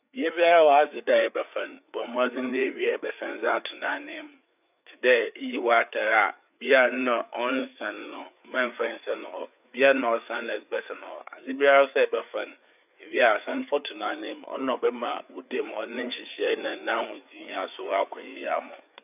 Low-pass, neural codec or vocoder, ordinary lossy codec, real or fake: 3.6 kHz; codec, 16 kHz, 4 kbps, FreqCodec, larger model; none; fake